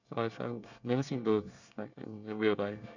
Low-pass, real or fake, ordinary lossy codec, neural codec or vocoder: 7.2 kHz; fake; none; codec, 24 kHz, 1 kbps, SNAC